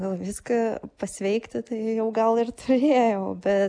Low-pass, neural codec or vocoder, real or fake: 9.9 kHz; none; real